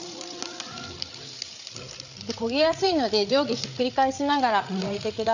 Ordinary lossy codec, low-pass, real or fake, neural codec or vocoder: none; 7.2 kHz; fake; codec, 16 kHz, 8 kbps, FreqCodec, larger model